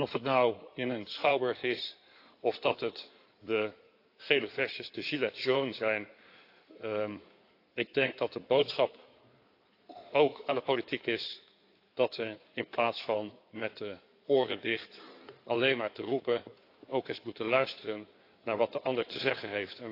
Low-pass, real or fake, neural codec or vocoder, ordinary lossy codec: 5.4 kHz; fake; codec, 16 kHz in and 24 kHz out, 2.2 kbps, FireRedTTS-2 codec; AAC, 32 kbps